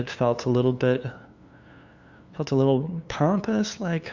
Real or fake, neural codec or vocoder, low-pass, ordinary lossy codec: fake; codec, 16 kHz, 2 kbps, FunCodec, trained on LibriTTS, 25 frames a second; 7.2 kHz; Opus, 64 kbps